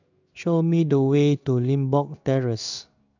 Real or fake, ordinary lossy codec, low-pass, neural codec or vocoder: fake; none; 7.2 kHz; codec, 16 kHz in and 24 kHz out, 1 kbps, XY-Tokenizer